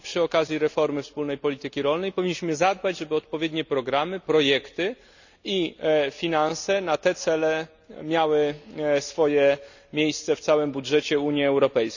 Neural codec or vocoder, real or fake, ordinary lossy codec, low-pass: none; real; none; 7.2 kHz